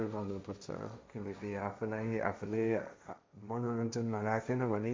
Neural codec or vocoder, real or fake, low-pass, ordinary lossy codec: codec, 16 kHz, 1.1 kbps, Voila-Tokenizer; fake; none; none